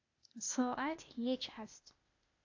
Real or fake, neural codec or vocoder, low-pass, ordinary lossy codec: fake; codec, 16 kHz, 0.8 kbps, ZipCodec; 7.2 kHz; AAC, 48 kbps